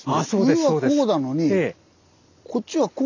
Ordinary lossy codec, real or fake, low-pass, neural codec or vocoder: none; real; 7.2 kHz; none